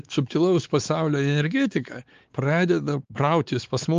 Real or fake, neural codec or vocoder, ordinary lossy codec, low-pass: fake; codec, 16 kHz, 4 kbps, X-Codec, WavLM features, trained on Multilingual LibriSpeech; Opus, 32 kbps; 7.2 kHz